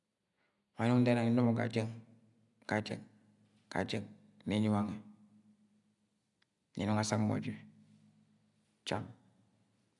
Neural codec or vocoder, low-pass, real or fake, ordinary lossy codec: none; 10.8 kHz; real; none